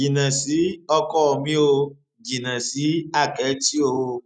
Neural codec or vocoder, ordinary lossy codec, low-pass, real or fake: none; none; none; real